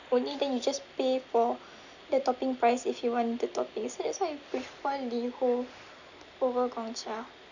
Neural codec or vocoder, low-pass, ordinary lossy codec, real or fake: none; 7.2 kHz; none; real